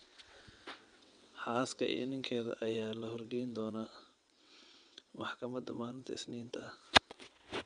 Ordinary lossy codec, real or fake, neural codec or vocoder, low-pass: none; fake; vocoder, 22.05 kHz, 80 mel bands, WaveNeXt; 9.9 kHz